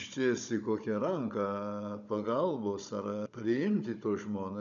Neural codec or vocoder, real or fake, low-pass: codec, 16 kHz, 16 kbps, FunCodec, trained on Chinese and English, 50 frames a second; fake; 7.2 kHz